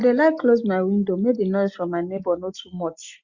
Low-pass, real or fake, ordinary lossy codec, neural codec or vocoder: 7.2 kHz; real; Opus, 64 kbps; none